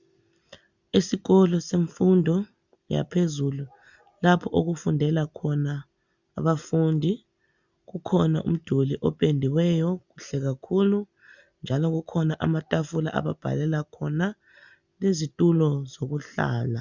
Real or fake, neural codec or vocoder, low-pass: real; none; 7.2 kHz